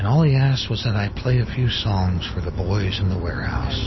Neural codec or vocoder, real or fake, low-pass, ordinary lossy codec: none; real; 7.2 kHz; MP3, 24 kbps